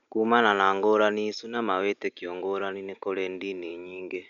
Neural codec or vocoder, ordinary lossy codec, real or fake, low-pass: none; none; real; 7.2 kHz